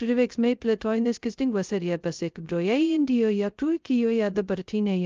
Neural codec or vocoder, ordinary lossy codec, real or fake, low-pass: codec, 16 kHz, 0.2 kbps, FocalCodec; Opus, 24 kbps; fake; 7.2 kHz